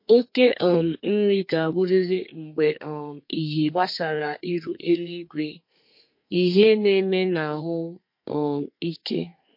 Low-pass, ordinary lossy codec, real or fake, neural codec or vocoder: 5.4 kHz; MP3, 32 kbps; fake; codec, 32 kHz, 1.9 kbps, SNAC